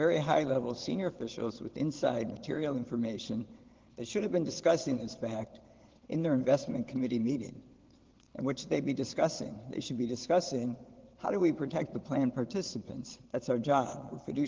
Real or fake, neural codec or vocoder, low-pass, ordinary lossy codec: fake; vocoder, 22.05 kHz, 80 mel bands, WaveNeXt; 7.2 kHz; Opus, 16 kbps